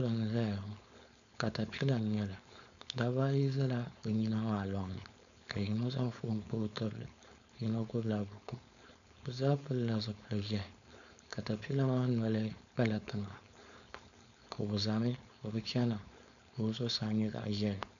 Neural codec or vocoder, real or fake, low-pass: codec, 16 kHz, 4.8 kbps, FACodec; fake; 7.2 kHz